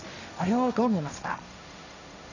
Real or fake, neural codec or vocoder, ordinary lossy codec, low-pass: fake; codec, 16 kHz, 1.1 kbps, Voila-Tokenizer; none; 7.2 kHz